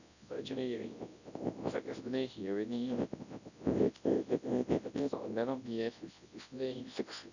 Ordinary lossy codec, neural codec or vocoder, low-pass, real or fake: none; codec, 24 kHz, 0.9 kbps, WavTokenizer, large speech release; 7.2 kHz; fake